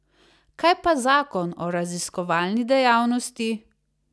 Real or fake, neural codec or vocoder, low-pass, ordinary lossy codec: real; none; none; none